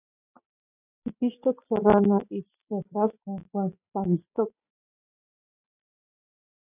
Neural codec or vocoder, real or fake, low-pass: none; real; 3.6 kHz